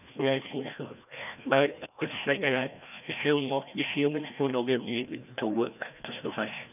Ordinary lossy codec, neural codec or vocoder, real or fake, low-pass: none; codec, 16 kHz, 1 kbps, FreqCodec, larger model; fake; 3.6 kHz